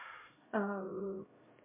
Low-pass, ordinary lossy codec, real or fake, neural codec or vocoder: 3.6 kHz; MP3, 24 kbps; fake; codec, 16 kHz, 0.5 kbps, X-Codec, HuBERT features, trained on LibriSpeech